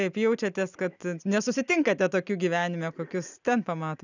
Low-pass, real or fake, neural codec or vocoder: 7.2 kHz; real; none